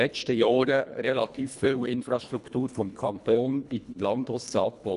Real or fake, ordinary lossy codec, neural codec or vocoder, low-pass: fake; none; codec, 24 kHz, 1.5 kbps, HILCodec; 10.8 kHz